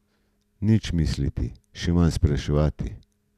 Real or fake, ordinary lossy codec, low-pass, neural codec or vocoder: real; none; 14.4 kHz; none